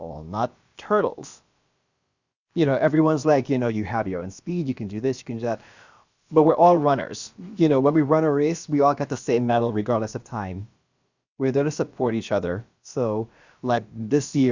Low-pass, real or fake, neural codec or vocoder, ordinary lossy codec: 7.2 kHz; fake; codec, 16 kHz, about 1 kbps, DyCAST, with the encoder's durations; Opus, 64 kbps